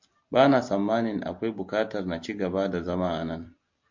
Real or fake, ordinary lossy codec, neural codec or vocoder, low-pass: real; MP3, 48 kbps; none; 7.2 kHz